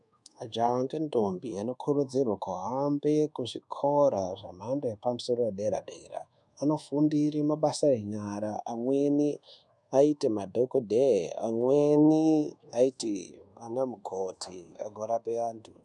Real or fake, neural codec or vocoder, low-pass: fake; codec, 24 kHz, 1.2 kbps, DualCodec; 10.8 kHz